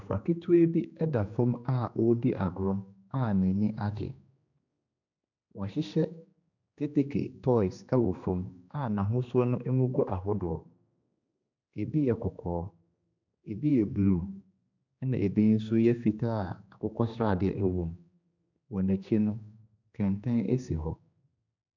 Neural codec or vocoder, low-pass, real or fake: codec, 16 kHz, 2 kbps, X-Codec, HuBERT features, trained on general audio; 7.2 kHz; fake